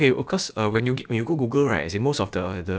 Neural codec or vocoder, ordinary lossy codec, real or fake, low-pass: codec, 16 kHz, about 1 kbps, DyCAST, with the encoder's durations; none; fake; none